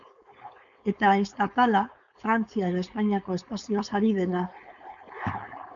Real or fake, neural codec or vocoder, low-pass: fake; codec, 16 kHz, 4.8 kbps, FACodec; 7.2 kHz